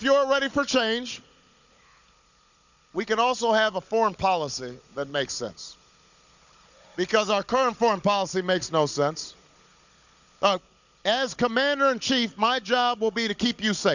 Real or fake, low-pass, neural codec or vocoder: fake; 7.2 kHz; codec, 16 kHz, 16 kbps, FunCodec, trained on Chinese and English, 50 frames a second